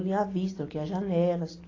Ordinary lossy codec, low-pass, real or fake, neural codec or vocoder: AAC, 32 kbps; 7.2 kHz; real; none